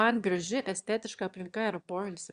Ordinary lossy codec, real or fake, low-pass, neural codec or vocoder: Opus, 64 kbps; fake; 9.9 kHz; autoencoder, 22.05 kHz, a latent of 192 numbers a frame, VITS, trained on one speaker